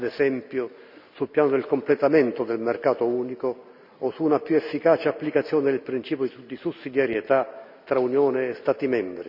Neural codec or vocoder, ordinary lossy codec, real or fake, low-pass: none; none; real; 5.4 kHz